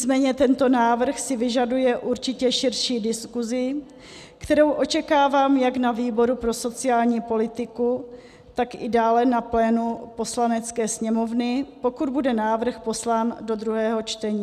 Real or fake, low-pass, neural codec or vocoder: real; 14.4 kHz; none